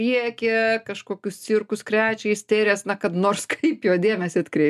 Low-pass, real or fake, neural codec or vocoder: 14.4 kHz; real; none